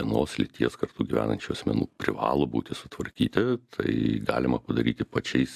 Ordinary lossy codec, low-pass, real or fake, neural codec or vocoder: AAC, 96 kbps; 14.4 kHz; real; none